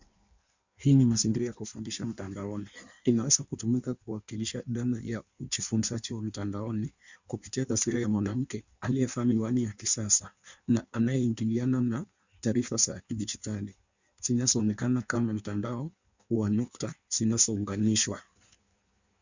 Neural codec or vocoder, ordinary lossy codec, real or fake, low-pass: codec, 16 kHz in and 24 kHz out, 1.1 kbps, FireRedTTS-2 codec; Opus, 64 kbps; fake; 7.2 kHz